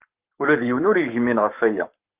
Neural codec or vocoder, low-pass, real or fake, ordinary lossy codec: codec, 44.1 kHz, 7.8 kbps, Pupu-Codec; 3.6 kHz; fake; Opus, 24 kbps